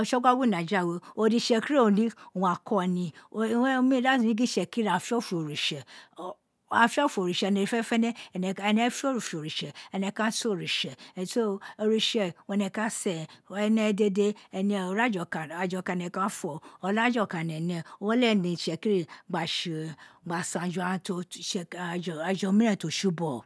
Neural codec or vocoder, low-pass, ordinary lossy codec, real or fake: none; none; none; real